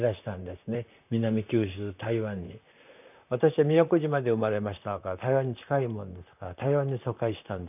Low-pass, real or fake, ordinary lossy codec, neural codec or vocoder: 3.6 kHz; fake; none; vocoder, 44.1 kHz, 128 mel bands, Pupu-Vocoder